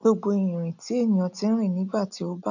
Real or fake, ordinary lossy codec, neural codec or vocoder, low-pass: real; none; none; 7.2 kHz